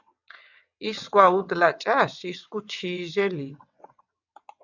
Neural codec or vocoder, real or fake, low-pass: vocoder, 22.05 kHz, 80 mel bands, WaveNeXt; fake; 7.2 kHz